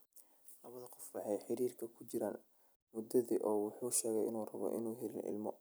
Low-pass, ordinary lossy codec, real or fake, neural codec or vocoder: none; none; real; none